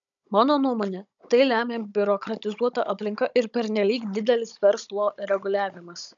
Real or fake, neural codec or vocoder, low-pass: fake; codec, 16 kHz, 16 kbps, FunCodec, trained on Chinese and English, 50 frames a second; 7.2 kHz